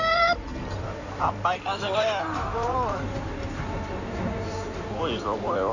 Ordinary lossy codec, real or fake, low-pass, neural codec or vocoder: none; fake; 7.2 kHz; codec, 16 kHz in and 24 kHz out, 2.2 kbps, FireRedTTS-2 codec